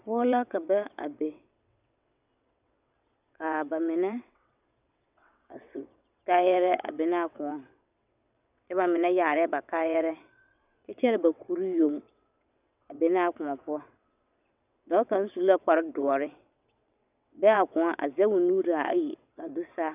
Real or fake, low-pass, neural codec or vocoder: fake; 3.6 kHz; vocoder, 22.05 kHz, 80 mel bands, WaveNeXt